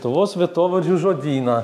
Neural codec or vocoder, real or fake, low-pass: none; real; 14.4 kHz